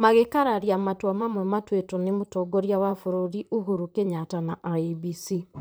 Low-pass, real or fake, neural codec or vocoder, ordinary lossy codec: none; fake; vocoder, 44.1 kHz, 128 mel bands, Pupu-Vocoder; none